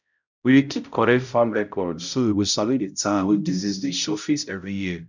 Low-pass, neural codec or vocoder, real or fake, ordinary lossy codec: 7.2 kHz; codec, 16 kHz, 0.5 kbps, X-Codec, HuBERT features, trained on balanced general audio; fake; none